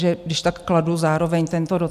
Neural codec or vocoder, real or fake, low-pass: none; real; 14.4 kHz